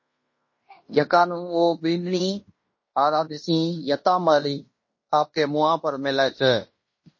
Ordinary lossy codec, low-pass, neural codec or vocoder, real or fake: MP3, 32 kbps; 7.2 kHz; codec, 16 kHz in and 24 kHz out, 0.9 kbps, LongCat-Audio-Codec, fine tuned four codebook decoder; fake